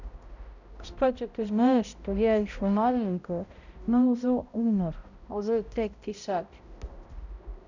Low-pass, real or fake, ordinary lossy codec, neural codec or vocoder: 7.2 kHz; fake; none; codec, 16 kHz, 0.5 kbps, X-Codec, HuBERT features, trained on balanced general audio